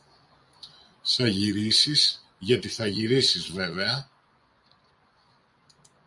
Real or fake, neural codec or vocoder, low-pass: fake; vocoder, 24 kHz, 100 mel bands, Vocos; 10.8 kHz